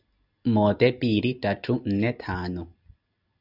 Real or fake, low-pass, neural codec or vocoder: real; 5.4 kHz; none